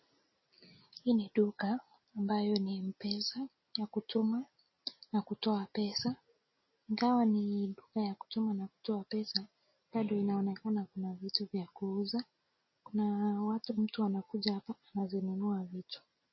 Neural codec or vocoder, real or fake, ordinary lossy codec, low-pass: none; real; MP3, 24 kbps; 7.2 kHz